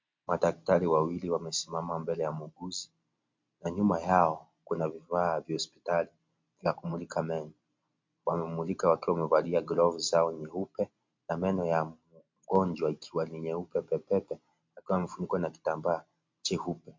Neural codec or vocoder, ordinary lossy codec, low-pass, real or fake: none; MP3, 48 kbps; 7.2 kHz; real